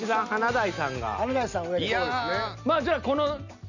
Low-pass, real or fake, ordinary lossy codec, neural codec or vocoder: 7.2 kHz; real; none; none